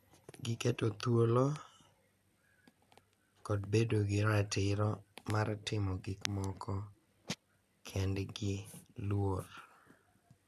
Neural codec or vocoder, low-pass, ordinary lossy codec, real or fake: none; 14.4 kHz; none; real